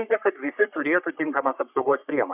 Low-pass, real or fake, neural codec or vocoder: 3.6 kHz; fake; codec, 44.1 kHz, 3.4 kbps, Pupu-Codec